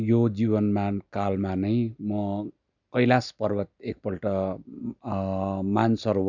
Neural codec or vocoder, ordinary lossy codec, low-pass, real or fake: none; none; 7.2 kHz; real